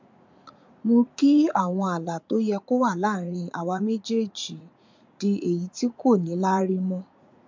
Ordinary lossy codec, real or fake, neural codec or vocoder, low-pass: MP3, 64 kbps; fake; vocoder, 44.1 kHz, 80 mel bands, Vocos; 7.2 kHz